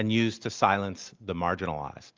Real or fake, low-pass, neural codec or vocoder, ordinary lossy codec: real; 7.2 kHz; none; Opus, 32 kbps